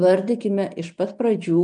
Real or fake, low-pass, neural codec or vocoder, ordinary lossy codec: real; 9.9 kHz; none; MP3, 96 kbps